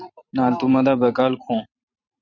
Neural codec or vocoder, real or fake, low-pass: vocoder, 44.1 kHz, 128 mel bands every 512 samples, BigVGAN v2; fake; 7.2 kHz